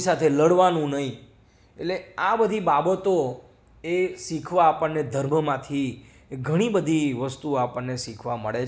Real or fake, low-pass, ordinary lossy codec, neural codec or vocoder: real; none; none; none